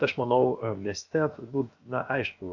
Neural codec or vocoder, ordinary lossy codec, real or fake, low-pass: codec, 16 kHz, 0.7 kbps, FocalCodec; Opus, 64 kbps; fake; 7.2 kHz